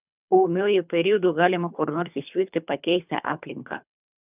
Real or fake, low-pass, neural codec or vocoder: fake; 3.6 kHz; codec, 24 kHz, 3 kbps, HILCodec